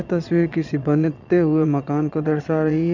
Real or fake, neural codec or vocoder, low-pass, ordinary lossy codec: fake; vocoder, 22.05 kHz, 80 mel bands, Vocos; 7.2 kHz; none